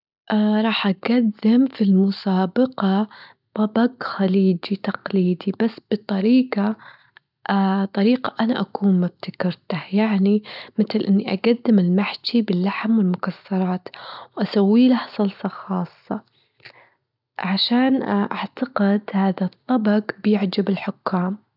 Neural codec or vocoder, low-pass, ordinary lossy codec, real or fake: none; 5.4 kHz; none; real